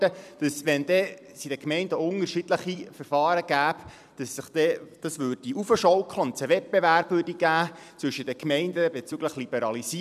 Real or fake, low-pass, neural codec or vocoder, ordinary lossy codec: fake; 14.4 kHz; vocoder, 44.1 kHz, 128 mel bands every 256 samples, BigVGAN v2; none